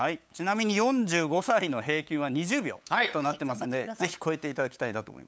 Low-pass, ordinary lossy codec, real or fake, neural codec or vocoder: none; none; fake; codec, 16 kHz, 8 kbps, FunCodec, trained on LibriTTS, 25 frames a second